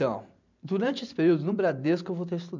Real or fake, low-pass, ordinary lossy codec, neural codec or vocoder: fake; 7.2 kHz; none; vocoder, 44.1 kHz, 128 mel bands every 512 samples, BigVGAN v2